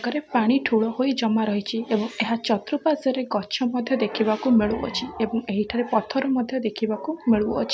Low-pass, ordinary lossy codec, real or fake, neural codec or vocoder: none; none; real; none